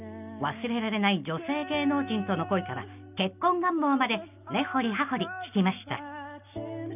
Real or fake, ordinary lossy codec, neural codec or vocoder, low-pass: real; none; none; 3.6 kHz